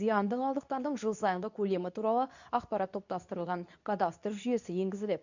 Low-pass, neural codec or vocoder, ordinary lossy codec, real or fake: 7.2 kHz; codec, 24 kHz, 0.9 kbps, WavTokenizer, medium speech release version 2; none; fake